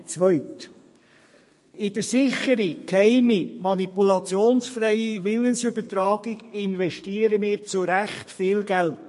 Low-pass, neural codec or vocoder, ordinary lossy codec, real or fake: 14.4 kHz; codec, 32 kHz, 1.9 kbps, SNAC; MP3, 48 kbps; fake